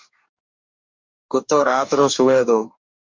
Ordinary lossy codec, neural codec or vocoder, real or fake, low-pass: MP3, 64 kbps; codec, 44.1 kHz, 2.6 kbps, DAC; fake; 7.2 kHz